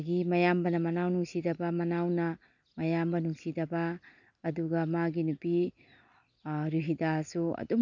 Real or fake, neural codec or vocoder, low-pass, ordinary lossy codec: real; none; 7.2 kHz; none